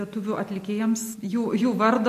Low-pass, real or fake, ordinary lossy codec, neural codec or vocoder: 14.4 kHz; real; MP3, 64 kbps; none